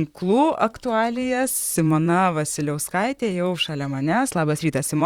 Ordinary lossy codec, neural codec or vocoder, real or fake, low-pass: Opus, 64 kbps; vocoder, 44.1 kHz, 128 mel bands, Pupu-Vocoder; fake; 19.8 kHz